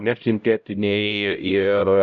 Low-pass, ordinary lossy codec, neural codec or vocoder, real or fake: 7.2 kHz; MP3, 96 kbps; codec, 16 kHz, 0.5 kbps, X-Codec, HuBERT features, trained on LibriSpeech; fake